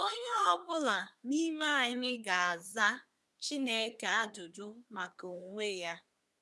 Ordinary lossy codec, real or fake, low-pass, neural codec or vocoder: none; fake; none; codec, 24 kHz, 1 kbps, SNAC